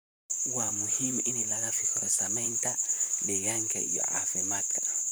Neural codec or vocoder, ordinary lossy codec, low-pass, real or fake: vocoder, 44.1 kHz, 128 mel bands, Pupu-Vocoder; none; none; fake